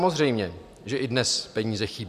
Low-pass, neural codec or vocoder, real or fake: 14.4 kHz; none; real